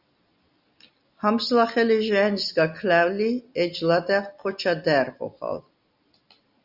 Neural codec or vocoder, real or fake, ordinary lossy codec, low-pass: none; real; Opus, 64 kbps; 5.4 kHz